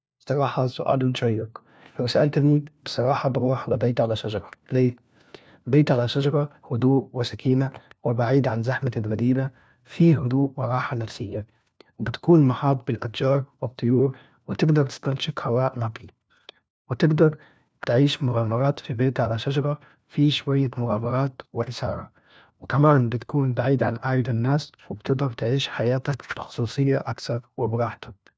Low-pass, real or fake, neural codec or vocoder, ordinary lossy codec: none; fake; codec, 16 kHz, 1 kbps, FunCodec, trained on LibriTTS, 50 frames a second; none